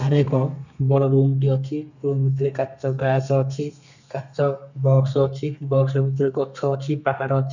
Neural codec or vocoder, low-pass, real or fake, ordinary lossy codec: codec, 32 kHz, 1.9 kbps, SNAC; 7.2 kHz; fake; none